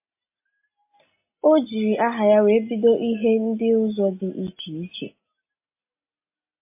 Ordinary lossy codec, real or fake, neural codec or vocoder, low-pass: MP3, 24 kbps; real; none; 3.6 kHz